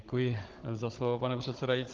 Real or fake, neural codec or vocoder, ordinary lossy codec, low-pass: fake; codec, 16 kHz, 16 kbps, FunCodec, trained on Chinese and English, 50 frames a second; Opus, 16 kbps; 7.2 kHz